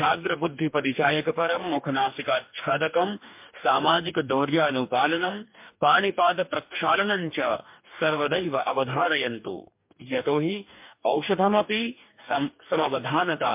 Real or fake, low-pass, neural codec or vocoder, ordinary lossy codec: fake; 3.6 kHz; codec, 44.1 kHz, 2.6 kbps, DAC; MP3, 32 kbps